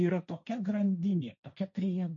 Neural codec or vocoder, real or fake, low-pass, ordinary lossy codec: codec, 16 kHz, 1.1 kbps, Voila-Tokenizer; fake; 7.2 kHz; MP3, 48 kbps